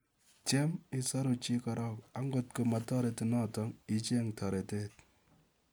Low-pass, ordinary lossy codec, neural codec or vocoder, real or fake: none; none; none; real